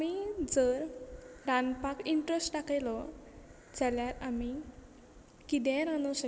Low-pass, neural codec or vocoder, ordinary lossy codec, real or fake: none; none; none; real